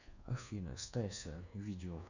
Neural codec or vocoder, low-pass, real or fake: codec, 24 kHz, 1.2 kbps, DualCodec; 7.2 kHz; fake